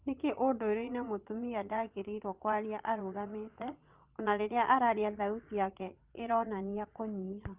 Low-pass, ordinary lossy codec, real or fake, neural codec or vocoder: 3.6 kHz; Opus, 32 kbps; fake; vocoder, 22.05 kHz, 80 mel bands, Vocos